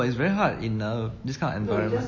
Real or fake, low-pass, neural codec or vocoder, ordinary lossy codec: real; 7.2 kHz; none; MP3, 32 kbps